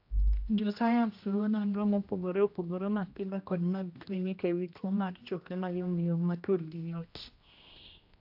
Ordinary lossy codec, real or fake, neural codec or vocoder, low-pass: AAC, 48 kbps; fake; codec, 16 kHz, 1 kbps, X-Codec, HuBERT features, trained on general audio; 5.4 kHz